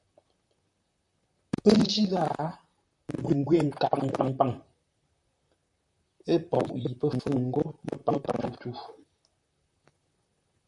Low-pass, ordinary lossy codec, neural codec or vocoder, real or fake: 10.8 kHz; MP3, 96 kbps; vocoder, 44.1 kHz, 128 mel bands, Pupu-Vocoder; fake